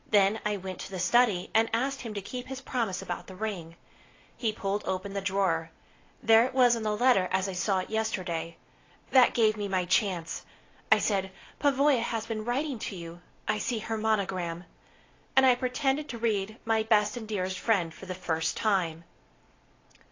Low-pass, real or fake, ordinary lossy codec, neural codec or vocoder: 7.2 kHz; real; AAC, 32 kbps; none